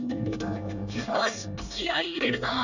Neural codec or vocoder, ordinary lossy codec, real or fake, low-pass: codec, 24 kHz, 1 kbps, SNAC; none; fake; 7.2 kHz